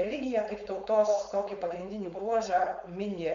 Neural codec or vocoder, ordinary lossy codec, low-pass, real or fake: codec, 16 kHz, 4.8 kbps, FACodec; MP3, 64 kbps; 7.2 kHz; fake